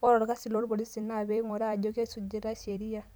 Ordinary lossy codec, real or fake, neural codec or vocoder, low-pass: none; fake; vocoder, 44.1 kHz, 128 mel bands every 256 samples, BigVGAN v2; none